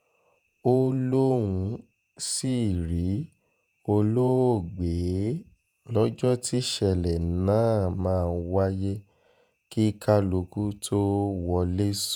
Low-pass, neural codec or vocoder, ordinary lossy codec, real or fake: none; vocoder, 48 kHz, 128 mel bands, Vocos; none; fake